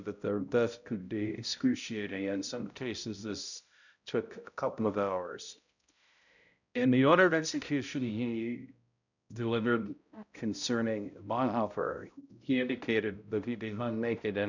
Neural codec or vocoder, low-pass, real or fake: codec, 16 kHz, 0.5 kbps, X-Codec, HuBERT features, trained on balanced general audio; 7.2 kHz; fake